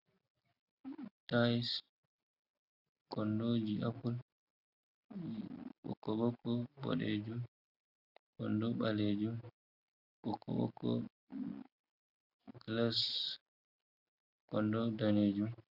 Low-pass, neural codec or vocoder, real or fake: 5.4 kHz; none; real